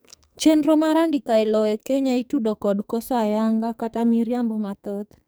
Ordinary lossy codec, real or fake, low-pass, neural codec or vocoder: none; fake; none; codec, 44.1 kHz, 2.6 kbps, SNAC